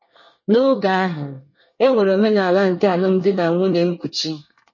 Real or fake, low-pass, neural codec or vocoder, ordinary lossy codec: fake; 7.2 kHz; codec, 24 kHz, 1 kbps, SNAC; MP3, 32 kbps